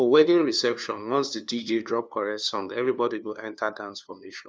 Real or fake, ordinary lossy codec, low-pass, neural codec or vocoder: fake; none; none; codec, 16 kHz, 2 kbps, FunCodec, trained on LibriTTS, 25 frames a second